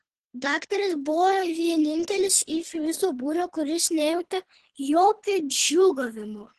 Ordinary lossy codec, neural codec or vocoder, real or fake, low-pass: Opus, 16 kbps; codec, 24 kHz, 3 kbps, HILCodec; fake; 10.8 kHz